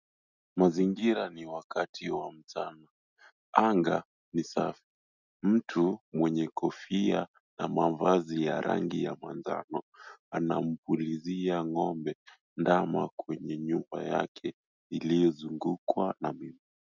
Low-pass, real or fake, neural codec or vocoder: 7.2 kHz; real; none